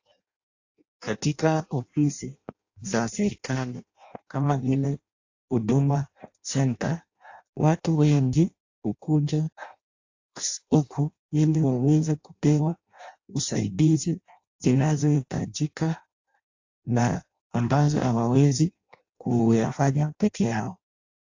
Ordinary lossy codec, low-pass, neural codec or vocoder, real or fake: AAC, 48 kbps; 7.2 kHz; codec, 16 kHz in and 24 kHz out, 0.6 kbps, FireRedTTS-2 codec; fake